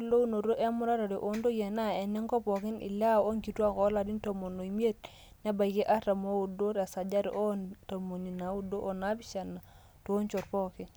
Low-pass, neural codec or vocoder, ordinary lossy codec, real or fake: none; none; none; real